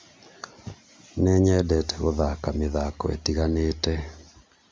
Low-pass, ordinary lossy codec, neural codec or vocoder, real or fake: none; none; none; real